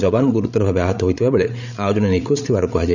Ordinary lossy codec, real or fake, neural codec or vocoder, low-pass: none; fake; codec, 16 kHz, 16 kbps, FreqCodec, larger model; 7.2 kHz